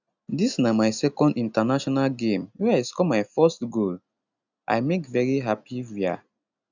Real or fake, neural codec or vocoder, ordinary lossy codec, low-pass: real; none; none; 7.2 kHz